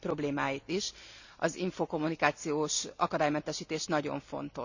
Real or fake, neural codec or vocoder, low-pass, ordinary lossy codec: real; none; 7.2 kHz; none